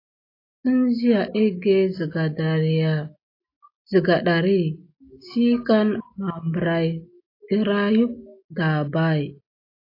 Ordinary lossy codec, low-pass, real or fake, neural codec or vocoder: AAC, 32 kbps; 5.4 kHz; real; none